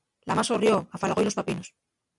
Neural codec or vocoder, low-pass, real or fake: none; 10.8 kHz; real